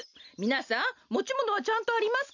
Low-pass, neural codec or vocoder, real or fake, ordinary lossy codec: 7.2 kHz; none; real; none